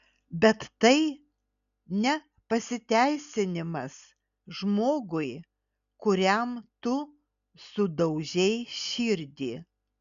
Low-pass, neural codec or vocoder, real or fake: 7.2 kHz; none; real